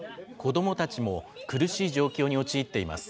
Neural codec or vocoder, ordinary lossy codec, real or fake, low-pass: none; none; real; none